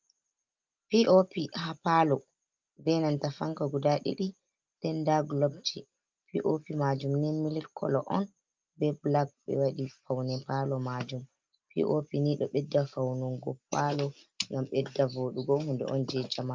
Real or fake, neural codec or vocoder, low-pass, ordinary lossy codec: real; none; 7.2 kHz; Opus, 24 kbps